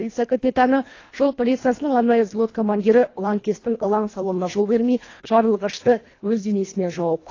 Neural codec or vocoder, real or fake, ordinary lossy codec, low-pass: codec, 24 kHz, 1.5 kbps, HILCodec; fake; AAC, 32 kbps; 7.2 kHz